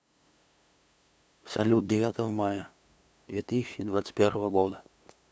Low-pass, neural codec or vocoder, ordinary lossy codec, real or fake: none; codec, 16 kHz, 2 kbps, FunCodec, trained on LibriTTS, 25 frames a second; none; fake